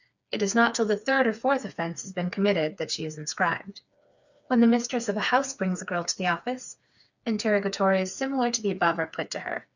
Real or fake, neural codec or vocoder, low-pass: fake; codec, 16 kHz, 4 kbps, FreqCodec, smaller model; 7.2 kHz